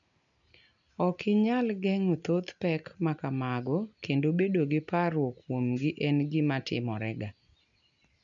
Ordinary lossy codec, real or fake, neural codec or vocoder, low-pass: none; real; none; 7.2 kHz